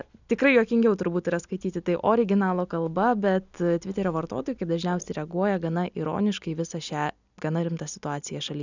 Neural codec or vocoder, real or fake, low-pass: none; real; 7.2 kHz